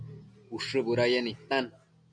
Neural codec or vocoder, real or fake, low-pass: none; real; 9.9 kHz